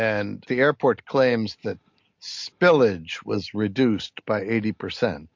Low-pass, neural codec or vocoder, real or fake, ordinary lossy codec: 7.2 kHz; none; real; MP3, 48 kbps